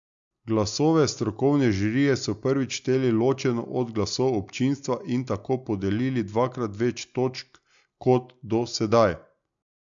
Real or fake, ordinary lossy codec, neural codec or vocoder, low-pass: real; MP3, 64 kbps; none; 7.2 kHz